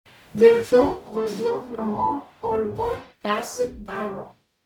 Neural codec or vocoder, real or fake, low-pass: codec, 44.1 kHz, 0.9 kbps, DAC; fake; 19.8 kHz